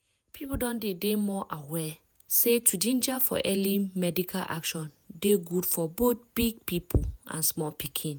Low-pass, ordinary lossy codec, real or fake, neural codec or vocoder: none; none; fake; vocoder, 48 kHz, 128 mel bands, Vocos